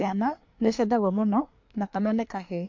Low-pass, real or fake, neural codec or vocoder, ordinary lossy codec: 7.2 kHz; fake; codec, 24 kHz, 1 kbps, SNAC; MP3, 48 kbps